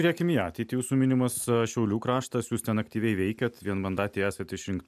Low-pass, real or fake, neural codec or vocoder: 14.4 kHz; real; none